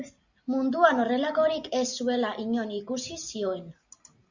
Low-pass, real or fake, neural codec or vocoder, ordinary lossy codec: 7.2 kHz; real; none; Opus, 64 kbps